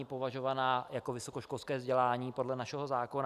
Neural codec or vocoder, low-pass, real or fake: none; 14.4 kHz; real